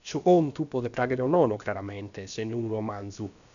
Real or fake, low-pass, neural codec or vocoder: fake; 7.2 kHz; codec, 16 kHz, about 1 kbps, DyCAST, with the encoder's durations